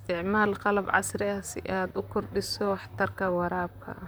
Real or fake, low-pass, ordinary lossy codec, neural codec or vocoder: fake; none; none; vocoder, 44.1 kHz, 128 mel bands, Pupu-Vocoder